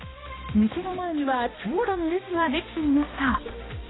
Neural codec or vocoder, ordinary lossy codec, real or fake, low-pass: codec, 16 kHz, 1 kbps, X-Codec, HuBERT features, trained on balanced general audio; AAC, 16 kbps; fake; 7.2 kHz